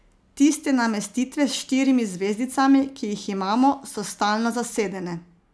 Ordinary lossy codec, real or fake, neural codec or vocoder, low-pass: none; real; none; none